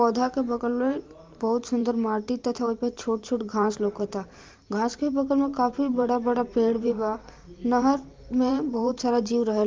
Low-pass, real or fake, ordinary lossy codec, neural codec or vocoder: 7.2 kHz; fake; Opus, 32 kbps; vocoder, 44.1 kHz, 128 mel bands every 512 samples, BigVGAN v2